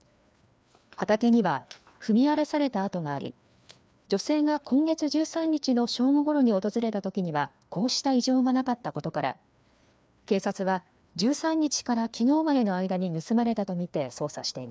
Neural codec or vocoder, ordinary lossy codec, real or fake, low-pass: codec, 16 kHz, 2 kbps, FreqCodec, larger model; none; fake; none